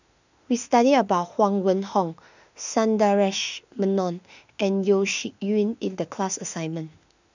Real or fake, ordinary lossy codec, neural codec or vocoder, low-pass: fake; none; autoencoder, 48 kHz, 32 numbers a frame, DAC-VAE, trained on Japanese speech; 7.2 kHz